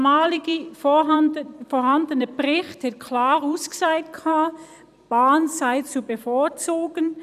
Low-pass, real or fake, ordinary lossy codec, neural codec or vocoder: 14.4 kHz; fake; none; vocoder, 44.1 kHz, 128 mel bands every 256 samples, BigVGAN v2